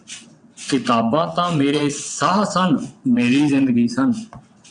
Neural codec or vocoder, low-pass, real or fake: vocoder, 22.05 kHz, 80 mel bands, WaveNeXt; 9.9 kHz; fake